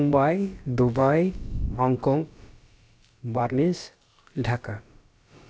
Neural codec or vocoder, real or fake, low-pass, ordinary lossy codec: codec, 16 kHz, about 1 kbps, DyCAST, with the encoder's durations; fake; none; none